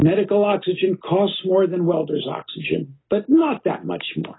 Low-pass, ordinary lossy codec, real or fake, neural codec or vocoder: 7.2 kHz; AAC, 16 kbps; real; none